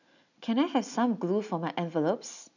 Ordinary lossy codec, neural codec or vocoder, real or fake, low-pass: none; none; real; 7.2 kHz